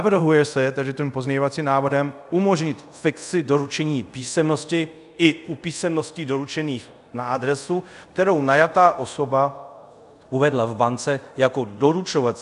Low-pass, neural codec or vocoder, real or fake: 10.8 kHz; codec, 24 kHz, 0.5 kbps, DualCodec; fake